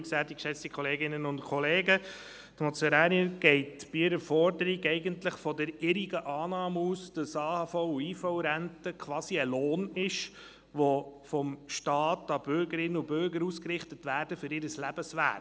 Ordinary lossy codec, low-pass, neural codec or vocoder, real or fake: none; none; none; real